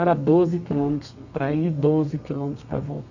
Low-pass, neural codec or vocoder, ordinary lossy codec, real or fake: 7.2 kHz; codec, 32 kHz, 1.9 kbps, SNAC; none; fake